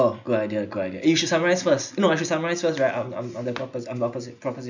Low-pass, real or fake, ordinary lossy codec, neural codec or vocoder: 7.2 kHz; real; none; none